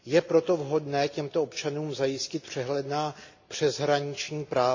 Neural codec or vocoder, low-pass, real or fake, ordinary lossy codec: none; 7.2 kHz; real; AAC, 48 kbps